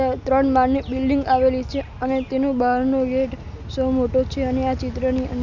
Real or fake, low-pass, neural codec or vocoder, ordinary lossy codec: real; 7.2 kHz; none; none